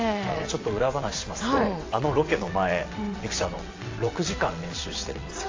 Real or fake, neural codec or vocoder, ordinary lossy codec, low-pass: fake; vocoder, 22.05 kHz, 80 mel bands, WaveNeXt; AAC, 32 kbps; 7.2 kHz